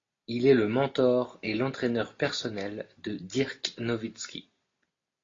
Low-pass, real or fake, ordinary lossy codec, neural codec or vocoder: 7.2 kHz; real; AAC, 32 kbps; none